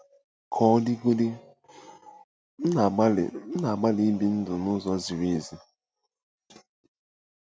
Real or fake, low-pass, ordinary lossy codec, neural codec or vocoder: real; none; none; none